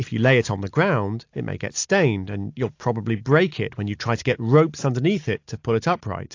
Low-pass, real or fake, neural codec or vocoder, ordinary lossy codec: 7.2 kHz; real; none; AAC, 48 kbps